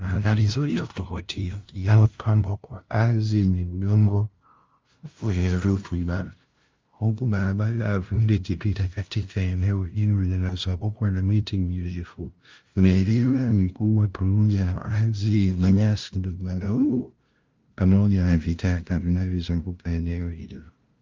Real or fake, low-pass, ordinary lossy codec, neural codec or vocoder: fake; 7.2 kHz; Opus, 24 kbps; codec, 16 kHz, 0.5 kbps, FunCodec, trained on LibriTTS, 25 frames a second